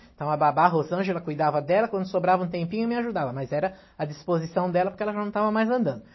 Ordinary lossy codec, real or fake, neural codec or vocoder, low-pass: MP3, 24 kbps; real; none; 7.2 kHz